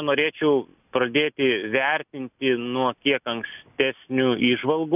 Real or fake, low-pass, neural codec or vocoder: real; 3.6 kHz; none